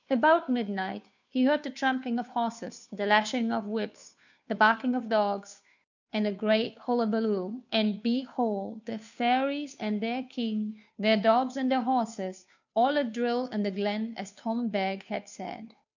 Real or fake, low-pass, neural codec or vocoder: fake; 7.2 kHz; codec, 16 kHz, 2 kbps, FunCodec, trained on Chinese and English, 25 frames a second